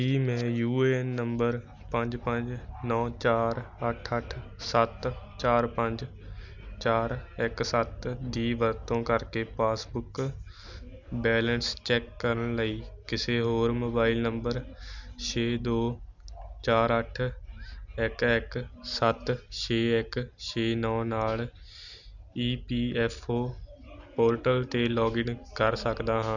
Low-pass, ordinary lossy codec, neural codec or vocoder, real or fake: 7.2 kHz; none; none; real